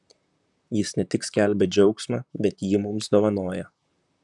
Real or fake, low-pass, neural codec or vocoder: fake; 10.8 kHz; vocoder, 44.1 kHz, 128 mel bands, Pupu-Vocoder